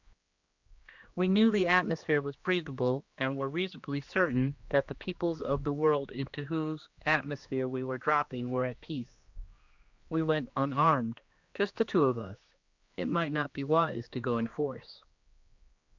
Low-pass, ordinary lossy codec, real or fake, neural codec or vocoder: 7.2 kHz; Opus, 64 kbps; fake; codec, 16 kHz, 2 kbps, X-Codec, HuBERT features, trained on general audio